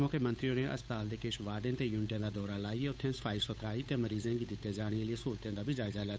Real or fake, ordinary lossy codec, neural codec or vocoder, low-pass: fake; none; codec, 16 kHz, 8 kbps, FunCodec, trained on Chinese and English, 25 frames a second; none